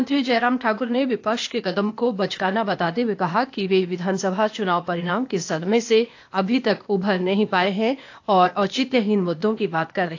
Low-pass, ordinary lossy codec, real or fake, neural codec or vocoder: 7.2 kHz; AAC, 48 kbps; fake; codec, 16 kHz, 0.8 kbps, ZipCodec